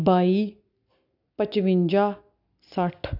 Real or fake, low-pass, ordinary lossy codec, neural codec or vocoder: real; 5.4 kHz; none; none